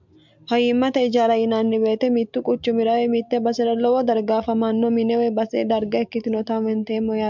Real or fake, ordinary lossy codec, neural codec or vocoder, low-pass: real; MP3, 64 kbps; none; 7.2 kHz